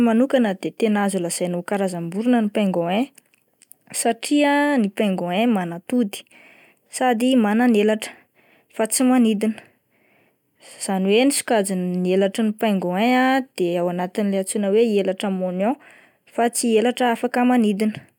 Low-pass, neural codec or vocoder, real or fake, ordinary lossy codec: 19.8 kHz; none; real; none